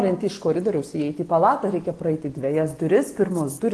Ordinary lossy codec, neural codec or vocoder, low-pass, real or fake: Opus, 16 kbps; none; 10.8 kHz; real